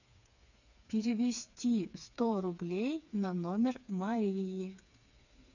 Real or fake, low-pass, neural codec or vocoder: fake; 7.2 kHz; codec, 16 kHz, 4 kbps, FreqCodec, smaller model